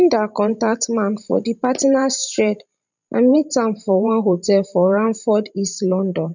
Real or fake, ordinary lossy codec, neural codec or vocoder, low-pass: fake; none; vocoder, 44.1 kHz, 128 mel bands every 512 samples, BigVGAN v2; 7.2 kHz